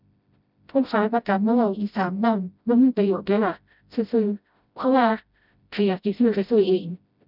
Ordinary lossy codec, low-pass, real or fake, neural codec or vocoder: none; 5.4 kHz; fake; codec, 16 kHz, 0.5 kbps, FreqCodec, smaller model